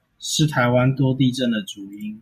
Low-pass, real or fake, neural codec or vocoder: 14.4 kHz; real; none